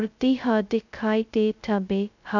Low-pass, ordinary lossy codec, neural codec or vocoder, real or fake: 7.2 kHz; none; codec, 16 kHz, 0.2 kbps, FocalCodec; fake